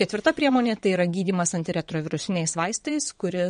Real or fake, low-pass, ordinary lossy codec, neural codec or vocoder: fake; 9.9 kHz; MP3, 48 kbps; vocoder, 22.05 kHz, 80 mel bands, WaveNeXt